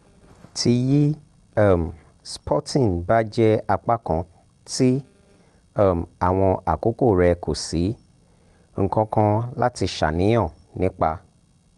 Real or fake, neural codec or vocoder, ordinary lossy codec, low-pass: real; none; Opus, 32 kbps; 10.8 kHz